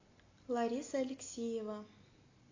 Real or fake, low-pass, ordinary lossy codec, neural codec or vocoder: real; 7.2 kHz; AAC, 32 kbps; none